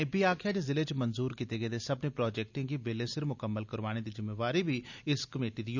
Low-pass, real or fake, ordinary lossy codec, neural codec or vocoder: 7.2 kHz; real; none; none